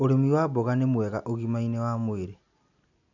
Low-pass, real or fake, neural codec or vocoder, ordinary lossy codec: 7.2 kHz; real; none; none